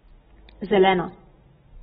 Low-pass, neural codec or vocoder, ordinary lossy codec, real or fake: 19.8 kHz; none; AAC, 16 kbps; real